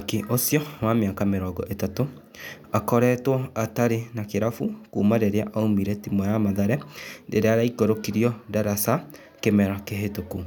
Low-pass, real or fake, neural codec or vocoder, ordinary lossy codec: 19.8 kHz; real; none; none